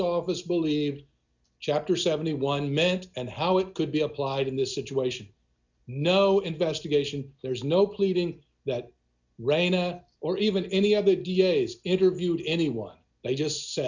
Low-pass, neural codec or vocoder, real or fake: 7.2 kHz; none; real